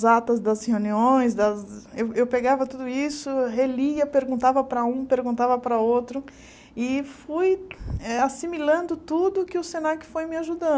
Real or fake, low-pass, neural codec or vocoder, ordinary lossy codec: real; none; none; none